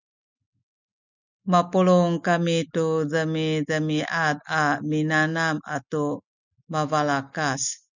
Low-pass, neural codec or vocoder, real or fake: 7.2 kHz; none; real